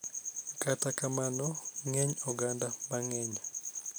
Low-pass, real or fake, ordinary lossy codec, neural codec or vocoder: none; real; none; none